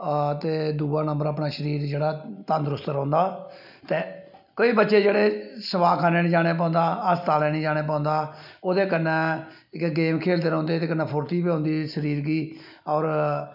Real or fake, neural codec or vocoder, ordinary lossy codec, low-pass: real; none; none; 5.4 kHz